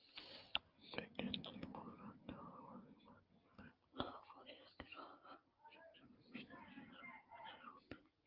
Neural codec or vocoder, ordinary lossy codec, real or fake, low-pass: none; Opus, 32 kbps; real; 5.4 kHz